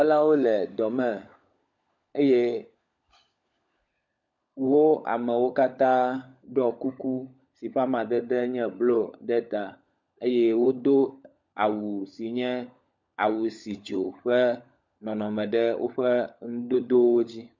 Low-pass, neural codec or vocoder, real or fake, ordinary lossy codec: 7.2 kHz; codec, 16 kHz, 16 kbps, FunCodec, trained on LibriTTS, 50 frames a second; fake; MP3, 48 kbps